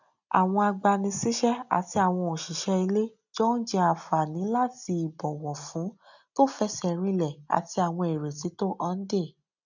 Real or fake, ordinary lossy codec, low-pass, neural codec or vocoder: real; none; 7.2 kHz; none